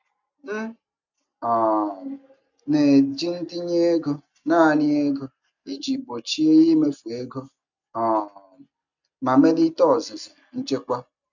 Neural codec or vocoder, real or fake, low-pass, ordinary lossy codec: none; real; 7.2 kHz; none